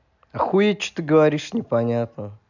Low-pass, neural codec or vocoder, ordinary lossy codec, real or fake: 7.2 kHz; none; none; real